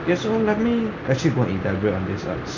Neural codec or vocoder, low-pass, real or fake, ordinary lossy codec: none; 7.2 kHz; real; none